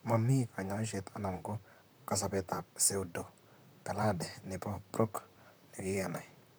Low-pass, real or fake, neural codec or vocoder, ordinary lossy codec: none; fake; vocoder, 44.1 kHz, 128 mel bands, Pupu-Vocoder; none